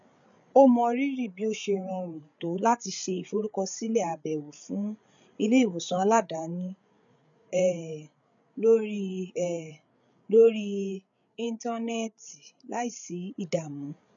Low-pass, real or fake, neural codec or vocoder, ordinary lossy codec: 7.2 kHz; fake; codec, 16 kHz, 8 kbps, FreqCodec, larger model; none